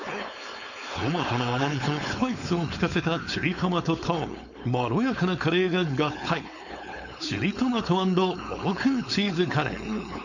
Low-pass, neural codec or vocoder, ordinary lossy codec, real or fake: 7.2 kHz; codec, 16 kHz, 4.8 kbps, FACodec; none; fake